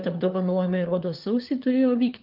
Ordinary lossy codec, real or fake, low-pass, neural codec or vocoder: Opus, 32 kbps; fake; 5.4 kHz; codec, 16 kHz, 4 kbps, FunCodec, trained on LibriTTS, 50 frames a second